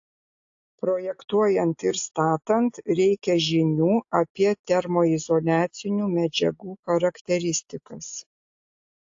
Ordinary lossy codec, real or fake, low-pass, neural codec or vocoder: AAC, 48 kbps; real; 7.2 kHz; none